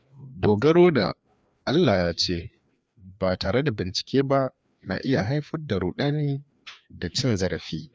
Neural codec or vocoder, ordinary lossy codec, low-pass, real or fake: codec, 16 kHz, 2 kbps, FreqCodec, larger model; none; none; fake